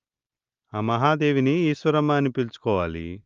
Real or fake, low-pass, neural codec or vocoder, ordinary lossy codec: real; 7.2 kHz; none; Opus, 32 kbps